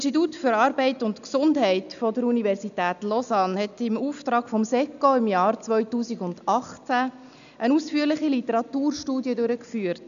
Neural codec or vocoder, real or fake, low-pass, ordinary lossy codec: none; real; 7.2 kHz; none